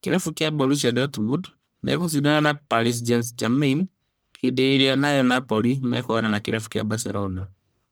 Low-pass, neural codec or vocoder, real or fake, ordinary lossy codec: none; codec, 44.1 kHz, 1.7 kbps, Pupu-Codec; fake; none